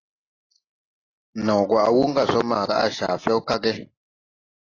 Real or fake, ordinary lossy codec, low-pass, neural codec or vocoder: real; AAC, 32 kbps; 7.2 kHz; none